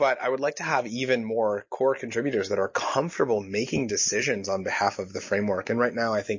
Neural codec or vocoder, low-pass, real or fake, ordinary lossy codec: none; 7.2 kHz; real; MP3, 32 kbps